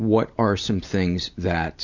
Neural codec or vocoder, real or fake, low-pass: none; real; 7.2 kHz